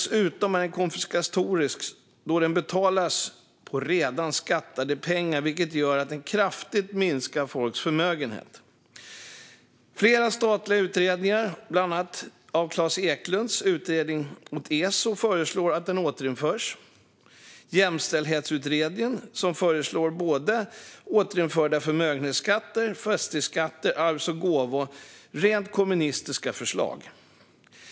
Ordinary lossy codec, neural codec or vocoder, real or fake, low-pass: none; none; real; none